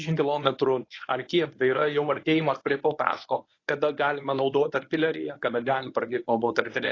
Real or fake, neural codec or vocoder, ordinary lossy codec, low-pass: fake; codec, 24 kHz, 0.9 kbps, WavTokenizer, medium speech release version 1; AAC, 32 kbps; 7.2 kHz